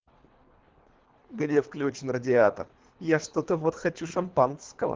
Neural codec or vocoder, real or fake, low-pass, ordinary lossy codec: codec, 24 kHz, 3 kbps, HILCodec; fake; 7.2 kHz; Opus, 16 kbps